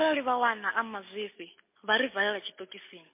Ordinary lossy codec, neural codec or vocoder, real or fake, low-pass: MP3, 24 kbps; none; real; 3.6 kHz